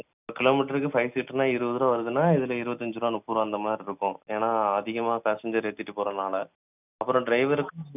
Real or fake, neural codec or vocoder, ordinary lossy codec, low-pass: real; none; none; 3.6 kHz